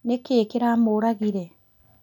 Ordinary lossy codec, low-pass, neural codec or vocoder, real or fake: none; 19.8 kHz; none; real